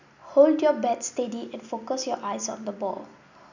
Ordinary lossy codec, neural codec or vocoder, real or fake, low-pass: none; none; real; 7.2 kHz